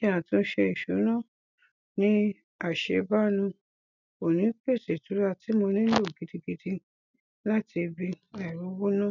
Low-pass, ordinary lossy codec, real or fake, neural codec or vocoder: 7.2 kHz; none; real; none